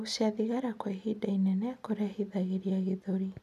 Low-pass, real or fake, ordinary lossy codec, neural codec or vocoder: 14.4 kHz; real; none; none